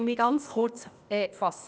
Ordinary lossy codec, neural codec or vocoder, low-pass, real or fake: none; codec, 16 kHz, 0.8 kbps, ZipCodec; none; fake